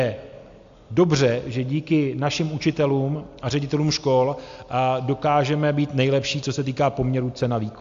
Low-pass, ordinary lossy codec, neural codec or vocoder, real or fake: 7.2 kHz; AAC, 64 kbps; none; real